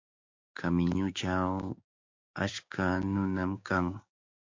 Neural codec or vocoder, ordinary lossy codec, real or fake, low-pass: codec, 16 kHz, 6 kbps, DAC; MP3, 48 kbps; fake; 7.2 kHz